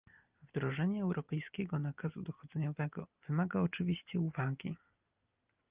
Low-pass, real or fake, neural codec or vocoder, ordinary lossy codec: 3.6 kHz; real; none; Opus, 24 kbps